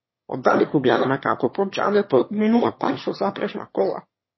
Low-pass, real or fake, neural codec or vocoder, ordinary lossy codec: 7.2 kHz; fake; autoencoder, 22.05 kHz, a latent of 192 numbers a frame, VITS, trained on one speaker; MP3, 24 kbps